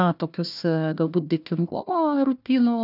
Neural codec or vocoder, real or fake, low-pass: codec, 16 kHz, 1 kbps, FunCodec, trained on Chinese and English, 50 frames a second; fake; 5.4 kHz